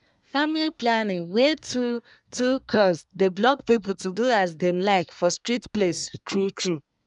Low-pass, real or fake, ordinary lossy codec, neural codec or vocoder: 10.8 kHz; fake; none; codec, 24 kHz, 1 kbps, SNAC